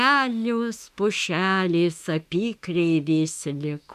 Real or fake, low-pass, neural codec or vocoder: fake; 14.4 kHz; autoencoder, 48 kHz, 32 numbers a frame, DAC-VAE, trained on Japanese speech